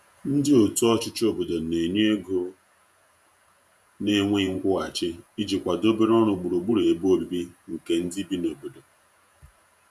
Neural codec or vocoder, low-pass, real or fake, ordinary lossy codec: vocoder, 48 kHz, 128 mel bands, Vocos; 14.4 kHz; fake; none